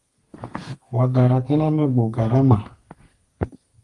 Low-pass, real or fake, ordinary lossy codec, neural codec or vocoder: 10.8 kHz; fake; Opus, 24 kbps; codec, 32 kHz, 1.9 kbps, SNAC